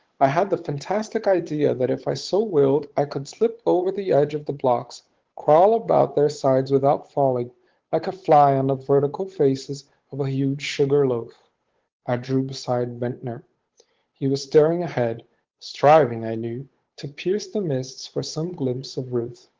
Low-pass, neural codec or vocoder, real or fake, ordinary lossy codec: 7.2 kHz; codec, 16 kHz, 8 kbps, FunCodec, trained on Chinese and English, 25 frames a second; fake; Opus, 16 kbps